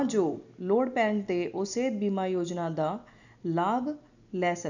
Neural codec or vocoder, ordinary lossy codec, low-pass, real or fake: none; none; 7.2 kHz; real